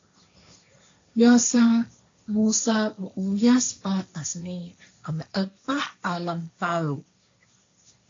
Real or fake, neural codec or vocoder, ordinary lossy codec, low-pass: fake; codec, 16 kHz, 1.1 kbps, Voila-Tokenizer; AAC, 48 kbps; 7.2 kHz